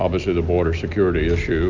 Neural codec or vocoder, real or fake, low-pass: none; real; 7.2 kHz